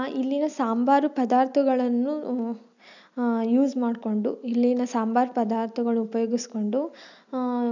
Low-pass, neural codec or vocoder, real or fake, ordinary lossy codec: 7.2 kHz; none; real; none